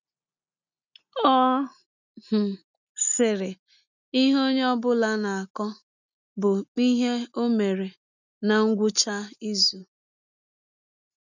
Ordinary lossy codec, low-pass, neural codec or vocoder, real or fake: none; 7.2 kHz; none; real